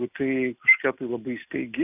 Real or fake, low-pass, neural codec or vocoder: real; 3.6 kHz; none